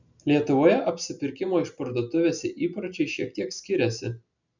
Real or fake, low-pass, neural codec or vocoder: real; 7.2 kHz; none